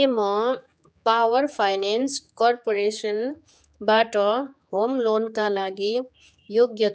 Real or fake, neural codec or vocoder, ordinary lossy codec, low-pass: fake; codec, 16 kHz, 4 kbps, X-Codec, HuBERT features, trained on general audio; none; none